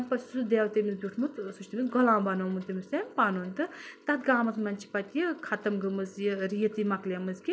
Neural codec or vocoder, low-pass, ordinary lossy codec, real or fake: none; none; none; real